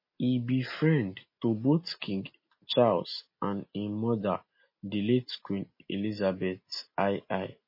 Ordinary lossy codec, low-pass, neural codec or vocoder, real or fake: MP3, 24 kbps; 5.4 kHz; none; real